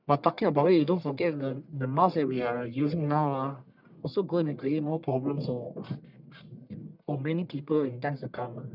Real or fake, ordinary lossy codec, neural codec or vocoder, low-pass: fake; none; codec, 44.1 kHz, 1.7 kbps, Pupu-Codec; 5.4 kHz